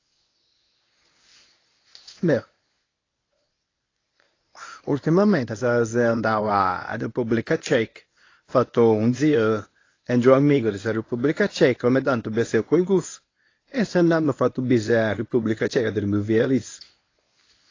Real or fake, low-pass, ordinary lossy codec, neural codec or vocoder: fake; 7.2 kHz; AAC, 32 kbps; codec, 24 kHz, 0.9 kbps, WavTokenizer, medium speech release version 1